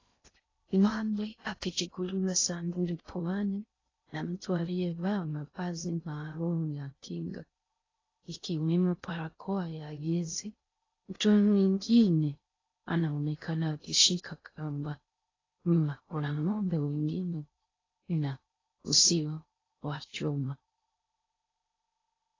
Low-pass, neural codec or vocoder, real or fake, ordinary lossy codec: 7.2 kHz; codec, 16 kHz in and 24 kHz out, 0.6 kbps, FocalCodec, streaming, 2048 codes; fake; AAC, 32 kbps